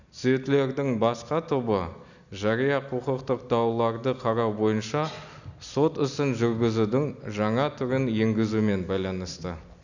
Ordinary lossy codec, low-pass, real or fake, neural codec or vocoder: none; 7.2 kHz; real; none